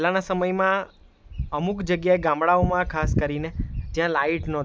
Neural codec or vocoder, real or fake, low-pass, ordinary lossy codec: none; real; none; none